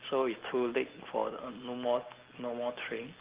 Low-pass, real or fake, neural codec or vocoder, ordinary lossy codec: 3.6 kHz; real; none; Opus, 16 kbps